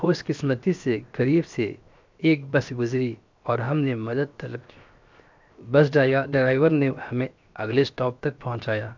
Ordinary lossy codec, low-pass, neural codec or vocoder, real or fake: MP3, 64 kbps; 7.2 kHz; codec, 16 kHz, 0.7 kbps, FocalCodec; fake